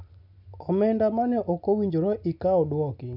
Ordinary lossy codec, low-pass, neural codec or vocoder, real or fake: none; 5.4 kHz; none; real